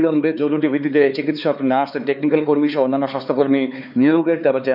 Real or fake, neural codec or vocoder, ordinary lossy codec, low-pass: fake; codec, 16 kHz, 4 kbps, X-Codec, HuBERT features, trained on LibriSpeech; none; 5.4 kHz